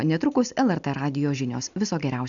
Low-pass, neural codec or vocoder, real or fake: 7.2 kHz; none; real